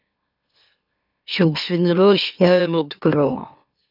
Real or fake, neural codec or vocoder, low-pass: fake; autoencoder, 44.1 kHz, a latent of 192 numbers a frame, MeloTTS; 5.4 kHz